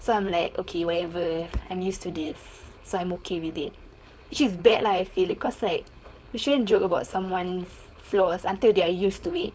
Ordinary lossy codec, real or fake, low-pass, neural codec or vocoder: none; fake; none; codec, 16 kHz, 4.8 kbps, FACodec